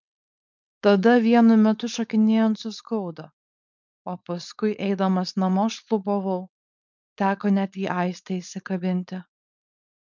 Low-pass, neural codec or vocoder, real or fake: 7.2 kHz; codec, 16 kHz, 4.8 kbps, FACodec; fake